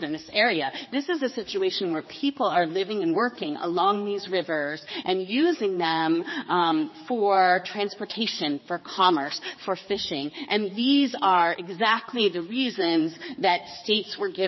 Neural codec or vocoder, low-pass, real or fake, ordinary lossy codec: codec, 16 kHz, 4 kbps, X-Codec, HuBERT features, trained on general audio; 7.2 kHz; fake; MP3, 24 kbps